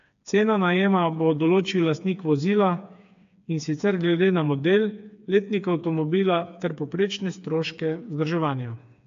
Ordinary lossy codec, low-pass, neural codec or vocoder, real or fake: AAC, 64 kbps; 7.2 kHz; codec, 16 kHz, 4 kbps, FreqCodec, smaller model; fake